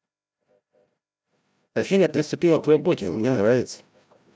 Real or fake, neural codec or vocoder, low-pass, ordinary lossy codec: fake; codec, 16 kHz, 0.5 kbps, FreqCodec, larger model; none; none